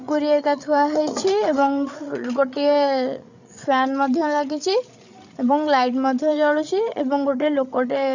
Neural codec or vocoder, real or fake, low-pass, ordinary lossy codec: codec, 16 kHz, 8 kbps, FreqCodec, larger model; fake; 7.2 kHz; none